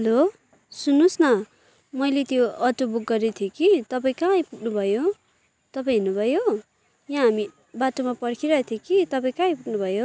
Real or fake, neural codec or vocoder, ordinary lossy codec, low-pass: real; none; none; none